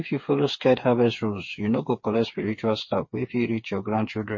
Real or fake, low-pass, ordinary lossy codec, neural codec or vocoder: fake; 7.2 kHz; MP3, 32 kbps; codec, 16 kHz, 4 kbps, FreqCodec, smaller model